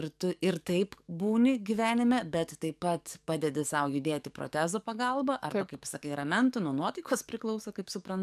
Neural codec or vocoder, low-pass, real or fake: codec, 44.1 kHz, 7.8 kbps, DAC; 14.4 kHz; fake